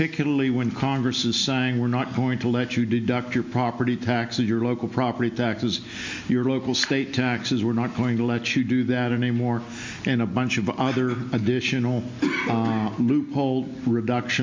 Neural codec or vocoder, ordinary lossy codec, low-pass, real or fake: none; MP3, 48 kbps; 7.2 kHz; real